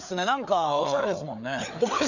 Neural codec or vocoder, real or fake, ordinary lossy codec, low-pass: codec, 16 kHz, 4 kbps, FreqCodec, larger model; fake; none; 7.2 kHz